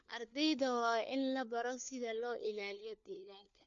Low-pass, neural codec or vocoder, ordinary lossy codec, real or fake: 7.2 kHz; codec, 16 kHz, 4 kbps, FunCodec, trained on LibriTTS, 50 frames a second; MP3, 48 kbps; fake